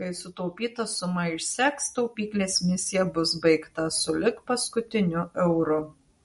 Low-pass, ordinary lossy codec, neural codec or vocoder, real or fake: 19.8 kHz; MP3, 48 kbps; none; real